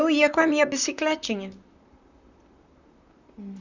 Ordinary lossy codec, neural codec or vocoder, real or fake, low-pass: none; vocoder, 44.1 kHz, 128 mel bands, Pupu-Vocoder; fake; 7.2 kHz